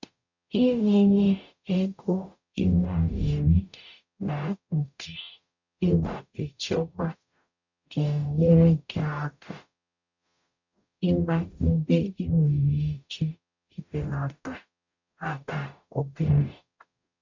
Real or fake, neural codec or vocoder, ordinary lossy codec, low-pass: fake; codec, 44.1 kHz, 0.9 kbps, DAC; none; 7.2 kHz